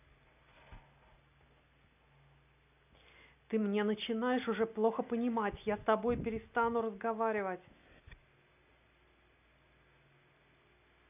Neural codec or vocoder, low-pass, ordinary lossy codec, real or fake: none; 3.6 kHz; none; real